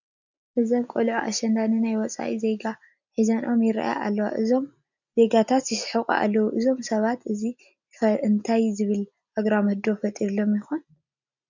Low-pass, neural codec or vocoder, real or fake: 7.2 kHz; none; real